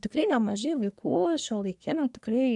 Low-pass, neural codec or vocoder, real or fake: 10.8 kHz; codec, 24 kHz, 1 kbps, SNAC; fake